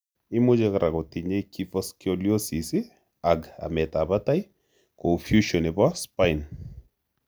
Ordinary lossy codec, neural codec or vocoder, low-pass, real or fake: none; none; none; real